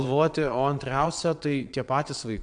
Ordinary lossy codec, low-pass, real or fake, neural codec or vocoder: MP3, 64 kbps; 9.9 kHz; fake; vocoder, 22.05 kHz, 80 mel bands, WaveNeXt